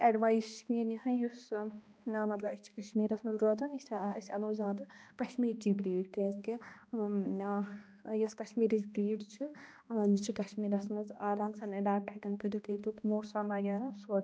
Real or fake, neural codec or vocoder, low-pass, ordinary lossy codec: fake; codec, 16 kHz, 1 kbps, X-Codec, HuBERT features, trained on balanced general audio; none; none